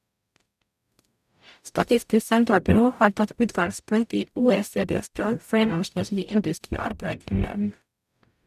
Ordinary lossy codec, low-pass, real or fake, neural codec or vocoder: none; 14.4 kHz; fake; codec, 44.1 kHz, 0.9 kbps, DAC